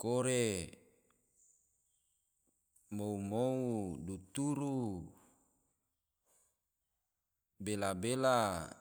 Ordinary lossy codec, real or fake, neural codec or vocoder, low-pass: none; real; none; none